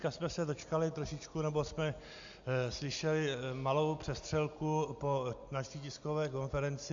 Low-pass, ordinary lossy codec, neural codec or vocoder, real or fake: 7.2 kHz; AAC, 64 kbps; none; real